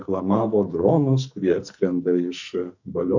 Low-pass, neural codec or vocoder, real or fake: 7.2 kHz; codec, 44.1 kHz, 2.6 kbps, SNAC; fake